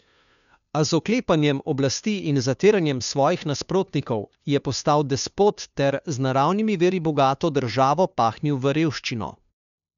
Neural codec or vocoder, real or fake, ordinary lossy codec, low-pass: codec, 16 kHz, 2 kbps, FunCodec, trained on Chinese and English, 25 frames a second; fake; none; 7.2 kHz